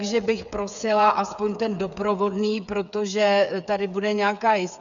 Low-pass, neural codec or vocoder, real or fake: 7.2 kHz; codec, 16 kHz, 8 kbps, FreqCodec, smaller model; fake